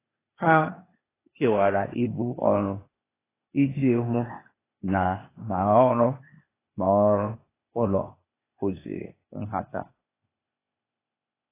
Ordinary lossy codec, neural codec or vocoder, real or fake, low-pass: AAC, 16 kbps; codec, 16 kHz, 0.8 kbps, ZipCodec; fake; 3.6 kHz